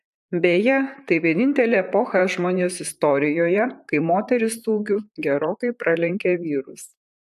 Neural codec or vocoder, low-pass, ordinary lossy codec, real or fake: vocoder, 44.1 kHz, 128 mel bands, Pupu-Vocoder; 14.4 kHz; AAC, 96 kbps; fake